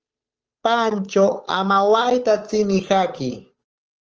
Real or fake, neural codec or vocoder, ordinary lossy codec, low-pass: fake; codec, 16 kHz, 8 kbps, FunCodec, trained on Chinese and English, 25 frames a second; Opus, 24 kbps; 7.2 kHz